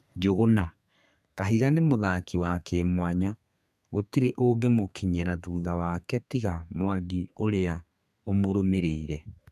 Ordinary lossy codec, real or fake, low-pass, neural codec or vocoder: none; fake; 14.4 kHz; codec, 32 kHz, 1.9 kbps, SNAC